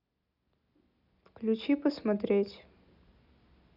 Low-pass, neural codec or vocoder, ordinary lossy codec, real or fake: 5.4 kHz; none; none; real